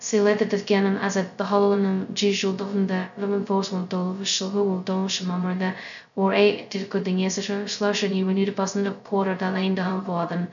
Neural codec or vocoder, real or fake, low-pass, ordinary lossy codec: codec, 16 kHz, 0.2 kbps, FocalCodec; fake; 7.2 kHz; none